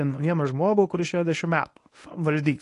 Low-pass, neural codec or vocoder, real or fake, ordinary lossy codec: 10.8 kHz; codec, 24 kHz, 0.9 kbps, WavTokenizer, medium speech release version 1; fake; AAC, 48 kbps